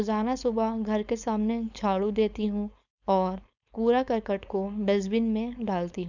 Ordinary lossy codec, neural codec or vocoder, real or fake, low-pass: none; codec, 16 kHz, 4.8 kbps, FACodec; fake; 7.2 kHz